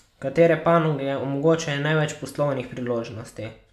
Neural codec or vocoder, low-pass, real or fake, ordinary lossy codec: none; 14.4 kHz; real; AAC, 96 kbps